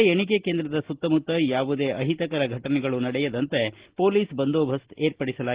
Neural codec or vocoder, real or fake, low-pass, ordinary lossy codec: none; real; 3.6 kHz; Opus, 16 kbps